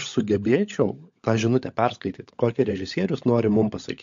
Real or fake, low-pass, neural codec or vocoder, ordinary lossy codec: fake; 7.2 kHz; codec, 16 kHz, 16 kbps, FunCodec, trained on LibriTTS, 50 frames a second; MP3, 48 kbps